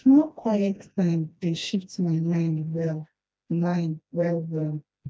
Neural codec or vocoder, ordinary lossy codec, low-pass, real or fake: codec, 16 kHz, 1 kbps, FreqCodec, smaller model; none; none; fake